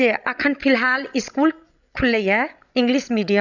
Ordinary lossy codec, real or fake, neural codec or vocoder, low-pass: none; fake; codec, 16 kHz, 16 kbps, FreqCodec, larger model; 7.2 kHz